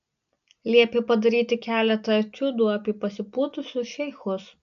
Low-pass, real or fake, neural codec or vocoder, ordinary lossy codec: 7.2 kHz; real; none; AAC, 96 kbps